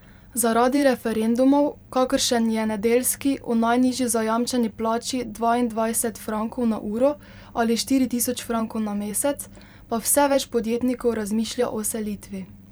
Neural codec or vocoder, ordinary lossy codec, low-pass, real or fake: vocoder, 44.1 kHz, 128 mel bands every 512 samples, BigVGAN v2; none; none; fake